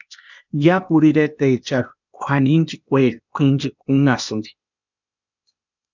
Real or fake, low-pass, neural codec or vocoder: fake; 7.2 kHz; codec, 16 kHz, 0.8 kbps, ZipCodec